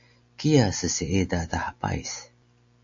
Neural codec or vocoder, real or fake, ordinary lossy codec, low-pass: none; real; AAC, 64 kbps; 7.2 kHz